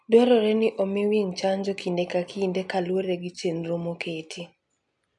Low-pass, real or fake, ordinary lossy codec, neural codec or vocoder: 10.8 kHz; real; none; none